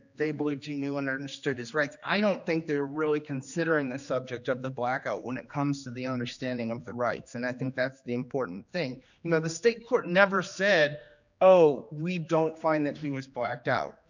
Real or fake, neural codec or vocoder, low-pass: fake; codec, 16 kHz, 2 kbps, X-Codec, HuBERT features, trained on general audio; 7.2 kHz